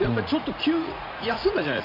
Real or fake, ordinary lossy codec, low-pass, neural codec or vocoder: fake; none; 5.4 kHz; vocoder, 44.1 kHz, 80 mel bands, Vocos